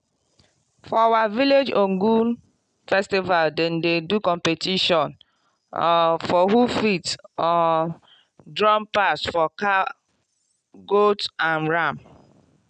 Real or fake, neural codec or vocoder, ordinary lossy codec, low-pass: real; none; none; 9.9 kHz